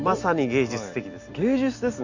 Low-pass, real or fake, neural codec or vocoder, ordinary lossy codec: 7.2 kHz; real; none; Opus, 64 kbps